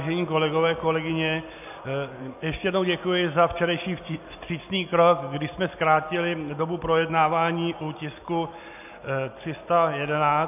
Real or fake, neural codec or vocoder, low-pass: real; none; 3.6 kHz